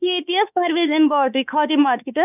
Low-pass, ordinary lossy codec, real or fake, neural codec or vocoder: 3.6 kHz; none; fake; autoencoder, 48 kHz, 32 numbers a frame, DAC-VAE, trained on Japanese speech